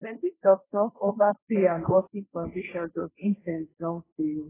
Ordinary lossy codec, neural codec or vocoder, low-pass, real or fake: AAC, 16 kbps; codec, 16 kHz, 1.1 kbps, Voila-Tokenizer; 3.6 kHz; fake